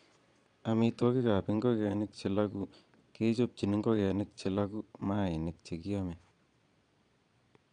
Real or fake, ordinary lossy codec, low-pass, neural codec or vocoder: fake; none; 9.9 kHz; vocoder, 22.05 kHz, 80 mel bands, Vocos